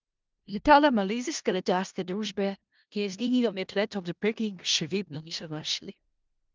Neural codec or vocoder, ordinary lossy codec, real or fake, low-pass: codec, 16 kHz in and 24 kHz out, 0.4 kbps, LongCat-Audio-Codec, four codebook decoder; Opus, 24 kbps; fake; 7.2 kHz